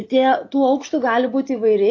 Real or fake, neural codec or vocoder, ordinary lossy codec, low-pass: real; none; AAC, 32 kbps; 7.2 kHz